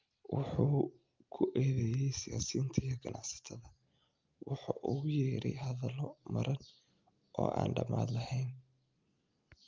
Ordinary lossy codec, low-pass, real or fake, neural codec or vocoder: Opus, 24 kbps; 7.2 kHz; real; none